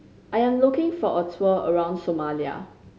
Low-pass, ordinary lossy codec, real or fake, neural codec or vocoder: none; none; real; none